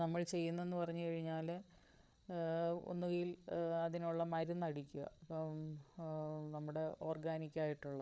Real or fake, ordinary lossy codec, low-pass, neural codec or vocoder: fake; none; none; codec, 16 kHz, 8 kbps, FreqCodec, larger model